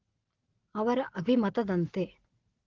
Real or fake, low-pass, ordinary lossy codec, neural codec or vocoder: real; 7.2 kHz; Opus, 16 kbps; none